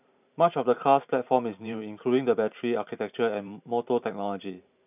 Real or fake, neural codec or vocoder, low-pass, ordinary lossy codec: fake; vocoder, 44.1 kHz, 128 mel bands every 512 samples, BigVGAN v2; 3.6 kHz; none